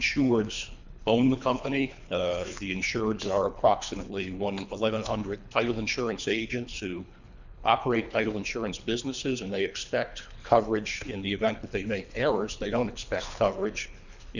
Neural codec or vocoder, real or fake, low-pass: codec, 24 kHz, 3 kbps, HILCodec; fake; 7.2 kHz